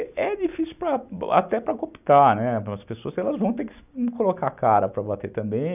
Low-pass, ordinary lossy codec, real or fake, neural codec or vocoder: 3.6 kHz; none; real; none